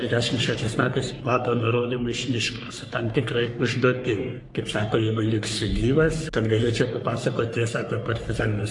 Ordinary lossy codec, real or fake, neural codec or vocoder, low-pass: AAC, 64 kbps; fake; codec, 44.1 kHz, 3.4 kbps, Pupu-Codec; 10.8 kHz